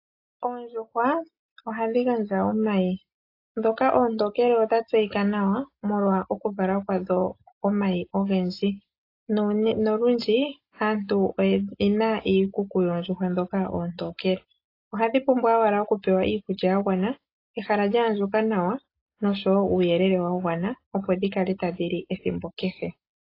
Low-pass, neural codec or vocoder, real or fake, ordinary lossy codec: 5.4 kHz; none; real; AAC, 32 kbps